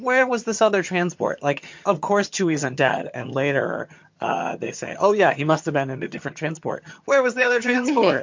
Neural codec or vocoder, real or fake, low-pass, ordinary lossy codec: vocoder, 22.05 kHz, 80 mel bands, HiFi-GAN; fake; 7.2 kHz; MP3, 48 kbps